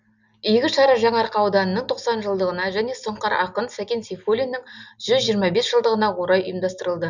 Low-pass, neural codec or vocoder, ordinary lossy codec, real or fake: 7.2 kHz; none; none; real